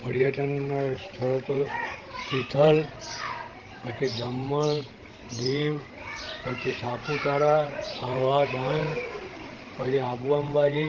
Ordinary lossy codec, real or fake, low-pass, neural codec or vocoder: Opus, 16 kbps; fake; 7.2 kHz; codec, 16 kHz, 16 kbps, FreqCodec, larger model